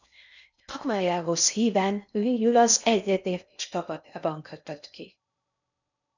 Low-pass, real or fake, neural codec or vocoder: 7.2 kHz; fake; codec, 16 kHz in and 24 kHz out, 0.6 kbps, FocalCodec, streaming, 4096 codes